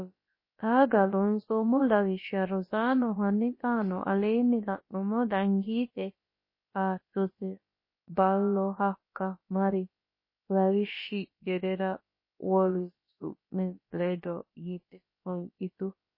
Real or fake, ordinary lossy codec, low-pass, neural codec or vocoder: fake; MP3, 24 kbps; 5.4 kHz; codec, 16 kHz, about 1 kbps, DyCAST, with the encoder's durations